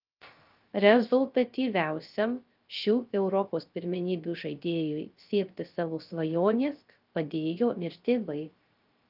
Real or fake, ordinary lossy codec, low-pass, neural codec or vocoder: fake; Opus, 24 kbps; 5.4 kHz; codec, 16 kHz, 0.3 kbps, FocalCodec